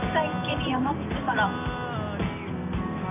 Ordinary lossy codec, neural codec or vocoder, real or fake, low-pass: none; none; real; 3.6 kHz